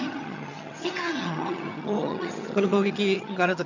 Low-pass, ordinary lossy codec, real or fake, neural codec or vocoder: 7.2 kHz; none; fake; vocoder, 22.05 kHz, 80 mel bands, HiFi-GAN